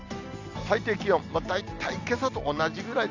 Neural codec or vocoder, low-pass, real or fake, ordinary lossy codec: none; 7.2 kHz; real; none